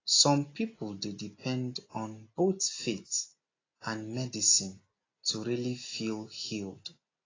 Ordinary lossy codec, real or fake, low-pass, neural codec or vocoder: AAC, 32 kbps; real; 7.2 kHz; none